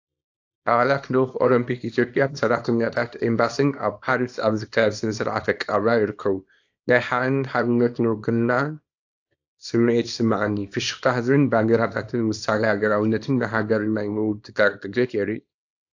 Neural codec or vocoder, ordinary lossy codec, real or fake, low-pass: codec, 24 kHz, 0.9 kbps, WavTokenizer, small release; AAC, 48 kbps; fake; 7.2 kHz